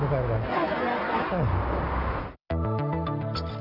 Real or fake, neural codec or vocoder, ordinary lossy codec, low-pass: real; none; none; 5.4 kHz